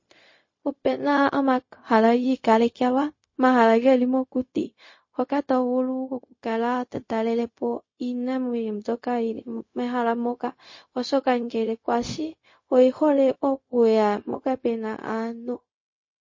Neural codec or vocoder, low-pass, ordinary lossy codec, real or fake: codec, 16 kHz, 0.4 kbps, LongCat-Audio-Codec; 7.2 kHz; MP3, 32 kbps; fake